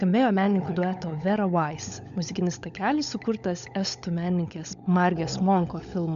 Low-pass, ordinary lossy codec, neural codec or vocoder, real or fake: 7.2 kHz; MP3, 96 kbps; codec, 16 kHz, 16 kbps, FunCodec, trained on LibriTTS, 50 frames a second; fake